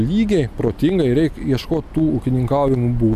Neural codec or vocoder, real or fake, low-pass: none; real; 14.4 kHz